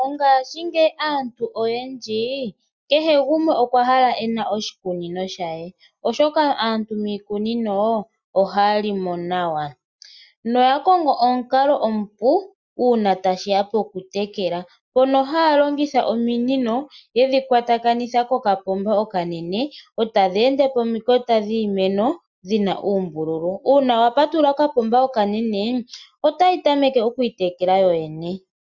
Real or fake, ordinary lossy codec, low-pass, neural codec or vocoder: real; Opus, 64 kbps; 7.2 kHz; none